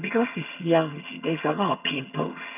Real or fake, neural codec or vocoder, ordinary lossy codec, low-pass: fake; vocoder, 22.05 kHz, 80 mel bands, HiFi-GAN; none; 3.6 kHz